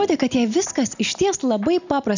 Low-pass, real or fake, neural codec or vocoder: 7.2 kHz; real; none